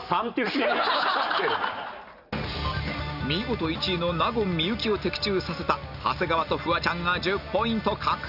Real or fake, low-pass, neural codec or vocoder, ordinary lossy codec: real; 5.4 kHz; none; none